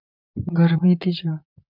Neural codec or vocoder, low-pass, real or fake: vocoder, 44.1 kHz, 128 mel bands, Pupu-Vocoder; 5.4 kHz; fake